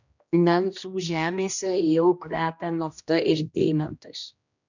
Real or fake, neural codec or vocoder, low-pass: fake; codec, 16 kHz, 1 kbps, X-Codec, HuBERT features, trained on general audio; 7.2 kHz